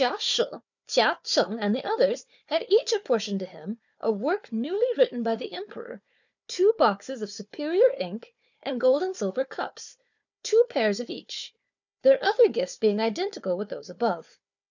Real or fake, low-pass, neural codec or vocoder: fake; 7.2 kHz; codec, 16 kHz, 4 kbps, FunCodec, trained on Chinese and English, 50 frames a second